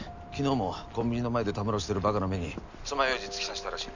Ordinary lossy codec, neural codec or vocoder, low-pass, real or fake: none; none; 7.2 kHz; real